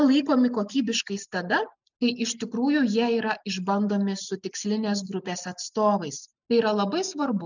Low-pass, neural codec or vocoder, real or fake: 7.2 kHz; none; real